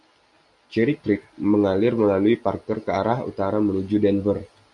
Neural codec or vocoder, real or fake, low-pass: none; real; 10.8 kHz